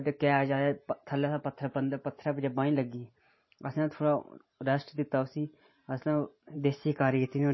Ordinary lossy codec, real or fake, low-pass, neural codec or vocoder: MP3, 24 kbps; fake; 7.2 kHz; vocoder, 44.1 kHz, 128 mel bands every 512 samples, BigVGAN v2